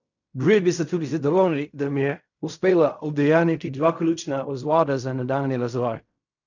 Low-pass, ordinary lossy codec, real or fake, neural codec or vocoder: 7.2 kHz; none; fake; codec, 16 kHz in and 24 kHz out, 0.4 kbps, LongCat-Audio-Codec, fine tuned four codebook decoder